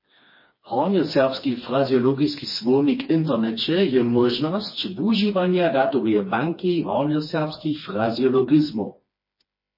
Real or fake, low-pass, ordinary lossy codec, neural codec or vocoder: fake; 5.4 kHz; MP3, 24 kbps; codec, 16 kHz, 2 kbps, FreqCodec, smaller model